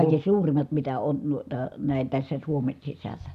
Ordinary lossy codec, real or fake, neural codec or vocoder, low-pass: none; real; none; 14.4 kHz